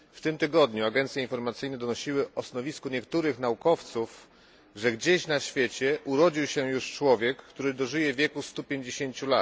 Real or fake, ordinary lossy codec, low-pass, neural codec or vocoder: real; none; none; none